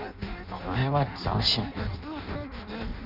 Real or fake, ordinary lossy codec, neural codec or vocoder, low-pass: fake; none; codec, 16 kHz in and 24 kHz out, 0.6 kbps, FireRedTTS-2 codec; 5.4 kHz